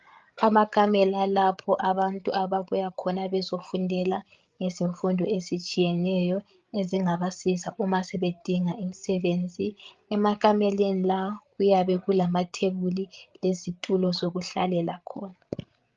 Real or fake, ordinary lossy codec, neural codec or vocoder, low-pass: fake; Opus, 32 kbps; codec, 16 kHz, 8 kbps, FreqCodec, larger model; 7.2 kHz